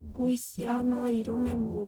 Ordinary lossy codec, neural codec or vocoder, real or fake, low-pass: none; codec, 44.1 kHz, 0.9 kbps, DAC; fake; none